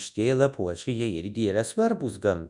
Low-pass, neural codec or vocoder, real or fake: 10.8 kHz; codec, 24 kHz, 0.9 kbps, WavTokenizer, large speech release; fake